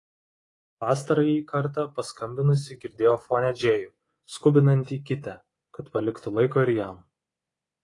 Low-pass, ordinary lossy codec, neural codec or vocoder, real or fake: 10.8 kHz; AAC, 32 kbps; codec, 24 kHz, 3.1 kbps, DualCodec; fake